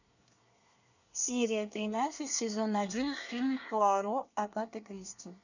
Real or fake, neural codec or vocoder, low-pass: fake; codec, 24 kHz, 1 kbps, SNAC; 7.2 kHz